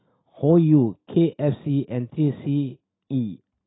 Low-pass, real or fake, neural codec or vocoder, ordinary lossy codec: 7.2 kHz; real; none; AAC, 16 kbps